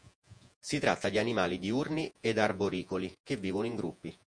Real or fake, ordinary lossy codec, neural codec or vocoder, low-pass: fake; MP3, 64 kbps; vocoder, 48 kHz, 128 mel bands, Vocos; 9.9 kHz